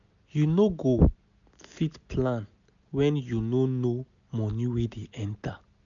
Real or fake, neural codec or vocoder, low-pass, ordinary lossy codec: real; none; 7.2 kHz; none